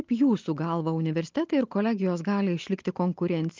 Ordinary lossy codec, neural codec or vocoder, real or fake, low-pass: Opus, 24 kbps; none; real; 7.2 kHz